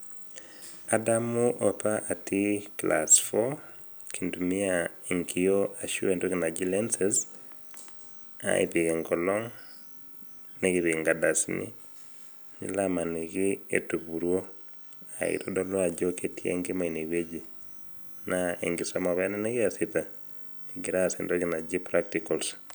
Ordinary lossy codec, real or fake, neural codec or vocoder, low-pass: none; real; none; none